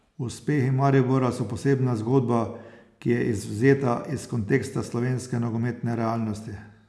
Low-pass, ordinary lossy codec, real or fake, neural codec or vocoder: none; none; real; none